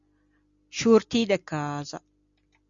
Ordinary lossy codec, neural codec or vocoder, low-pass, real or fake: Opus, 64 kbps; none; 7.2 kHz; real